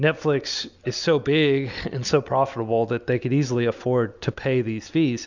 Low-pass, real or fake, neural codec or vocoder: 7.2 kHz; real; none